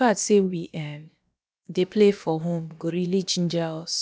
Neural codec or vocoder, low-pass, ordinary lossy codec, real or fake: codec, 16 kHz, about 1 kbps, DyCAST, with the encoder's durations; none; none; fake